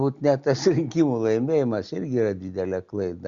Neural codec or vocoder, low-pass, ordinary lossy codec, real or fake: none; 7.2 kHz; Opus, 64 kbps; real